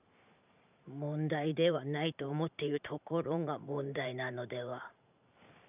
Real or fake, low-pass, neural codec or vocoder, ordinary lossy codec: fake; 3.6 kHz; vocoder, 44.1 kHz, 128 mel bands every 512 samples, BigVGAN v2; none